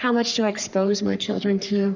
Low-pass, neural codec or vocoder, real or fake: 7.2 kHz; codec, 44.1 kHz, 3.4 kbps, Pupu-Codec; fake